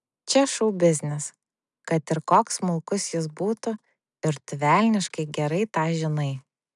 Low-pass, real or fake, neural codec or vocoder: 10.8 kHz; real; none